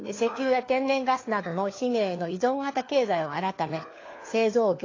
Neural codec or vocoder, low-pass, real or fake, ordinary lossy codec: codec, 16 kHz, 4 kbps, FunCodec, trained on LibriTTS, 50 frames a second; 7.2 kHz; fake; AAC, 32 kbps